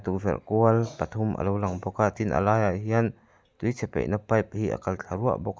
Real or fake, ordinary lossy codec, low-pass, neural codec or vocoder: real; none; none; none